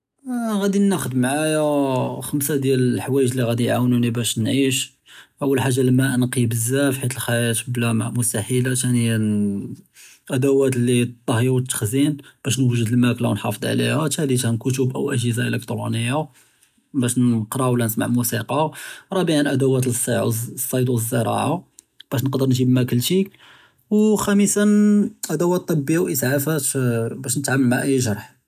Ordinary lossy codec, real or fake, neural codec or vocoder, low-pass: none; real; none; 14.4 kHz